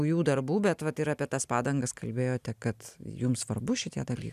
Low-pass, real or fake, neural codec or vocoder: 14.4 kHz; real; none